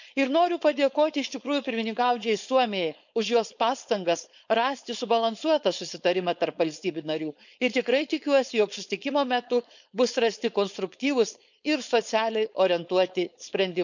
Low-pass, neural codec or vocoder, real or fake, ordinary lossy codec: 7.2 kHz; codec, 16 kHz, 4.8 kbps, FACodec; fake; none